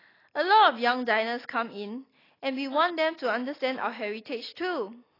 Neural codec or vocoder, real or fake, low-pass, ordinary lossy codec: none; real; 5.4 kHz; AAC, 24 kbps